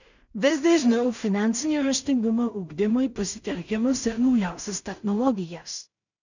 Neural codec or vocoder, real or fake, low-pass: codec, 16 kHz in and 24 kHz out, 0.4 kbps, LongCat-Audio-Codec, two codebook decoder; fake; 7.2 kHz